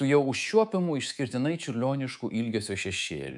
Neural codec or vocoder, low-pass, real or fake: codec, 24 kHz, 3.1 kbps, DualCodec; 10.8 kHz; fake